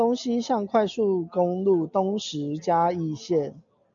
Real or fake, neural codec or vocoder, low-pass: real; none; 7.2 kHz